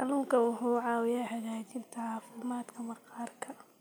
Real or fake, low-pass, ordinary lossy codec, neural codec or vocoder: real; none; none; none